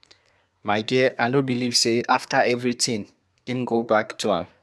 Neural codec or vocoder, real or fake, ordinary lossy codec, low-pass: codec, 24 kHz, 1 kbps, SNAC; fake; none; none